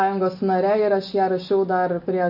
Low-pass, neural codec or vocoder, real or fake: 5.4 kHz; none; real